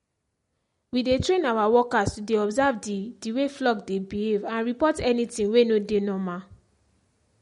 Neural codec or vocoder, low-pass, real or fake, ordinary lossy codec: none; 10.8 kHz; real; MP3, 48 kbps